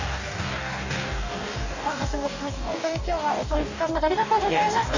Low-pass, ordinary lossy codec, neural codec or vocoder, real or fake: 7.2 kHz; none; codec, 44.1 kHz, 2.6 kbps, DAC; fake